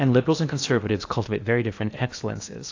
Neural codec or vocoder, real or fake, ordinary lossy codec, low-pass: codec, 16 kHz in and 24 kHz out, 0.8 kbps, FocalCodec, streaming, 65536 codes; fake; AAC, 48 kbps; 7.2 kHz